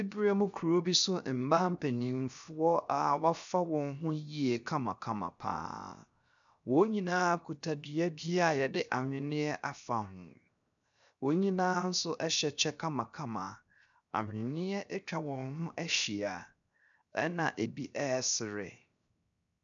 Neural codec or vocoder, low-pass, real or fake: codec, 16 kHz, 0.7 kbps, FocalCodec; 7.2 kHz; fake